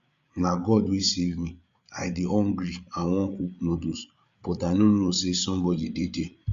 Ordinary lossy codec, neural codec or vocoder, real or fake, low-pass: Opus, 64 kbps; codec, 16 kHz, 8 kbps, FreqCodec, larger model; fake; 7.2 kHz